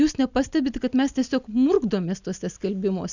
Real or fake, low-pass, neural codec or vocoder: real; 7.2 kHz; none